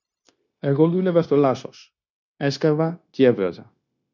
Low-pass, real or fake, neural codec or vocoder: 7.2 kHz; fake; codec, 16 kHz, 0.9 kbps, LongCat-Audio-Codec